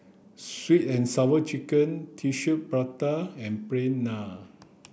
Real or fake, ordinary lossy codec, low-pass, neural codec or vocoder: real; none; none; none